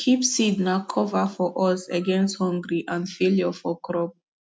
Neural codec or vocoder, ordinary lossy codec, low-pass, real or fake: none; none; none; real